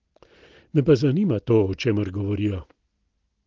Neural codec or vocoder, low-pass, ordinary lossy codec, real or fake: none; 7.2 kHz; Opus, 16 kbps; real